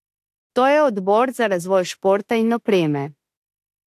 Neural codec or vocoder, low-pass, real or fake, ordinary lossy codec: autoencoder, 48 kHz, 32 numbers a frame, DAC-VAE, trained on Japanese speech; 14.4 kHz; fake; AAC, 64 kbps